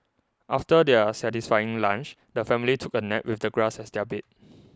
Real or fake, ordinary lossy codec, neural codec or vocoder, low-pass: real; none; none; none